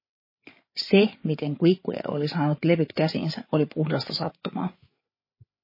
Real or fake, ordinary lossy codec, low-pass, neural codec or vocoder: fake; MP3, 24 kbps; 5.4 kHz; codec, 16 kHz, 8 kbps, FreqCodec, larger model